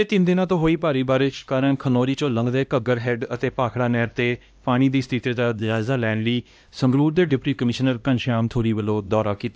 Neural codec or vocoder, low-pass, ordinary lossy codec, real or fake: codec, 16 kHz, 1 kbps, X-Codec, HuBERT features, trained on LibriSpeech; none; none; fake